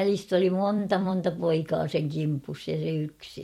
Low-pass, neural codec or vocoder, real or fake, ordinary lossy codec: 19.8 kHz; vocoder, 44.1 kHz, 128 mel bands every 256 samples, BigVGAN v2; fake; MP3, 64 kbps